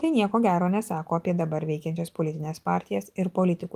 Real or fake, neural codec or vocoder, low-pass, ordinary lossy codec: real; none; 14.4 kHz; Opus, 24 kbps